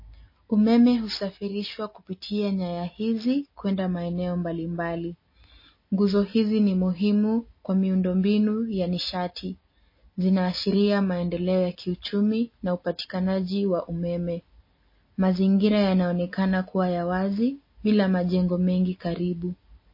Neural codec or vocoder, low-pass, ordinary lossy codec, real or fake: none; 5.4 kHz; MP3, 24 kbps; real